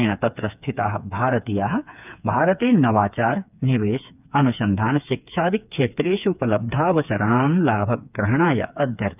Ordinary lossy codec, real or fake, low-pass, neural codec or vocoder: none; fake; 3.6 kHz; codec, 16 kHz, 4 kbps, FreqCodec, smaller model